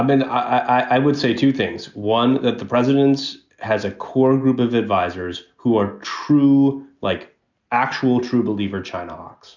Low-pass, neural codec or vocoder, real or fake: 7.2 kHz; none; real